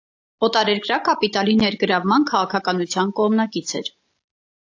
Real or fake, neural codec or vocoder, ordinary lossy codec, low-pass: real; none; AAC, 48 kbps; 7.2 kHz